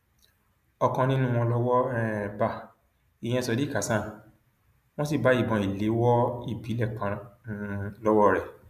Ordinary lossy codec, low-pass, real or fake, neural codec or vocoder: none; 14.4 kHz; real; none